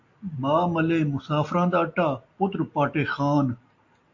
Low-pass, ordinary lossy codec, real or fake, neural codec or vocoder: 7.2 kHz; AAC, 48 kbps; real; none